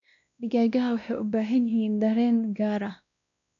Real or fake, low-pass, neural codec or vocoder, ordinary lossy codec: fake; 7.2 kHz; codec, 16 kHz, 1 kbps, X-Codec, WavLM features, trained on Multilingual LibriSpeech; MP3, 96 kbps